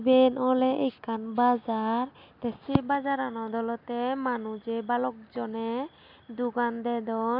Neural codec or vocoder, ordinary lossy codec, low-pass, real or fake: none; none; 5.4 kHz; real